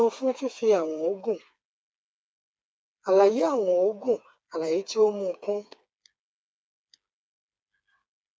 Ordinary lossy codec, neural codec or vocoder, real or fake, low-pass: none; codec, 16 kHz, 4 kbps, FreqCodec, smaller model; fake; none